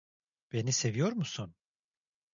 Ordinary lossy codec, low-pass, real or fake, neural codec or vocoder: MP3, 96 kbps; 7.2 kHz; real; none